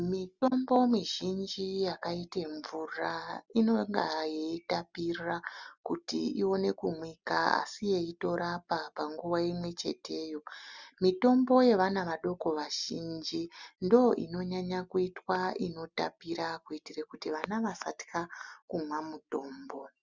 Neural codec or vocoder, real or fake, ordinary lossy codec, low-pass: none; real; Opus, 64 kbps; 7.2 kHz